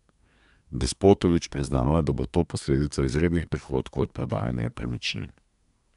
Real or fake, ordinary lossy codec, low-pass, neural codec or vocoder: fake; none; 10.8 kHz; codec, 24 kHz, 1 kbps, SNAC